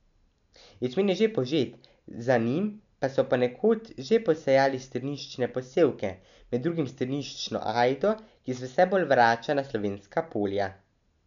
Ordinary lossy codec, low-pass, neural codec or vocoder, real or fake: none; 7.2 kHz; none; real